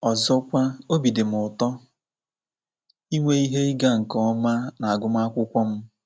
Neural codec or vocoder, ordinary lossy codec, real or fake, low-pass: none; none; real; none